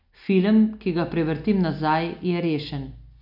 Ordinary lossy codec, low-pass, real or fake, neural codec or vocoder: none; 5.4 kHz; real; none